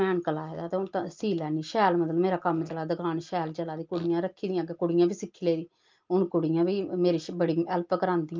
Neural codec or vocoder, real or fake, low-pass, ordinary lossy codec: none; real; 7.2 kHz; Opus, 32 kbps